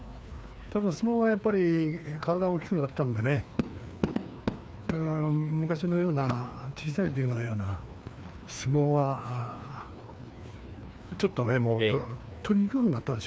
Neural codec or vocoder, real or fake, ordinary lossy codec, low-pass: codec, 16 kHz, 2 kbps, FreqCodec, larger model; fake; none; none